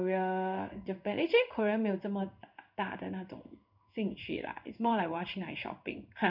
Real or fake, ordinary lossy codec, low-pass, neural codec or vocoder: fake; none; 5.4 kHz; codec, 16 kHz in and 24 kHz out, 1 kbps, XY-Tokenizer